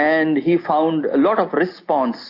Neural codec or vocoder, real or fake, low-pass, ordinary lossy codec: none; real; 5.4 kHz; AAC, 32 kbps